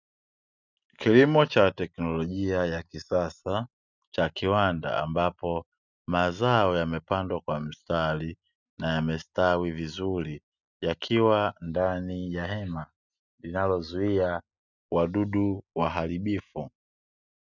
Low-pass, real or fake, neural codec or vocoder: 7.2 kHz; real; none